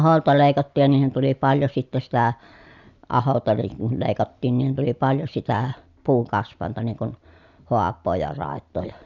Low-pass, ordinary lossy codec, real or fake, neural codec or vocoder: 7.2 kHz; none; real; none